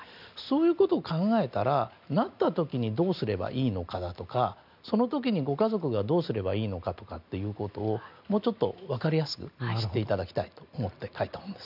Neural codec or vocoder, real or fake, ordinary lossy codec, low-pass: none; real; none; 5.4 kHz